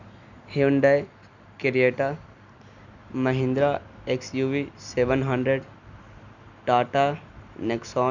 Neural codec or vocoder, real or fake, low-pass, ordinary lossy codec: none; real; 7.2 kHz; none